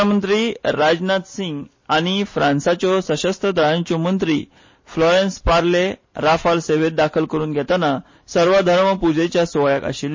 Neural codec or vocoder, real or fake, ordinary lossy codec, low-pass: none; real; MP3, 32 kbps; 7.2 kHz